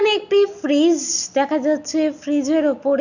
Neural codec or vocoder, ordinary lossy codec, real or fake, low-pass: none; none; real; 7.2 kHz